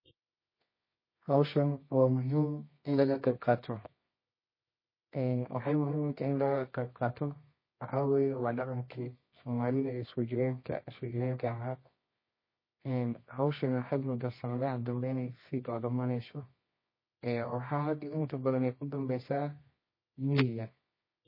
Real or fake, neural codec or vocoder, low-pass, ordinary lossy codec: fake; codec, 24 kHz, 0.9 kbps, WavTokenizer, medium music audio release; 5.4 kHz; MP3, 24 kbps